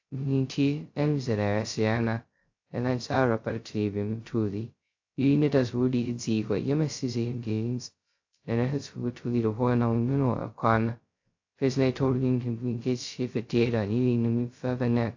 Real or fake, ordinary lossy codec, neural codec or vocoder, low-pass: fake; AAC, 48 kbps; codec, 16 kHz, 0.2 kbps, FocalCodec; 7.2 kHz